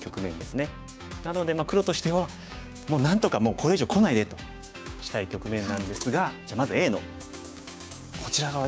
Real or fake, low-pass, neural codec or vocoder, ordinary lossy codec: fake; none; codec, 16 kHz, 6 kbps, DAC; none